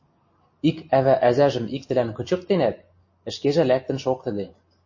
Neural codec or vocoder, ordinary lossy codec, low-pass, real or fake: none; MP3, 32 kbps; 7.2 kHz; real